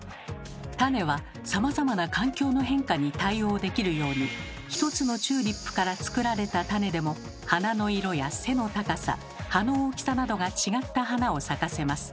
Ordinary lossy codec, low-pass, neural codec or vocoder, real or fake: none; none; none; real